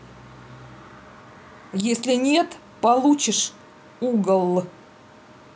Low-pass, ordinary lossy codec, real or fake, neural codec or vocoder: none; none; real; none